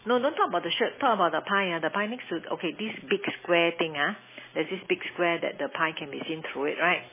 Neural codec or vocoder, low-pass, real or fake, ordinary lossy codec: none; 3.6 kHz; real; MP3, 16 kbps